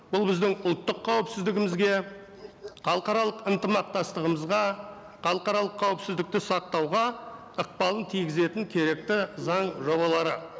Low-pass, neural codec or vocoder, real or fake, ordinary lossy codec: none; none; real; none